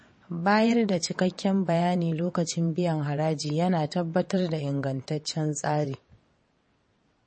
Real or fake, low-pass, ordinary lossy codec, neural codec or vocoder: fake; 9.9 kHz; MP3, 32 kbps; vocoder, 22.05 kHz, 80 mel bands, WaveNeXt